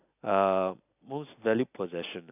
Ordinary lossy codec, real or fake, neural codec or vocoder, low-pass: none; real; none; 3.6 kHz